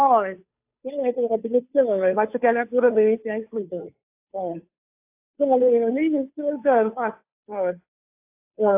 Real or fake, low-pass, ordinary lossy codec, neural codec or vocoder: fake; 3.6 kHz; AAC, 32 kbps; codec, 16 kHz, 2 kbps, FunCodec, trained on Chinese and English, 25 frames a second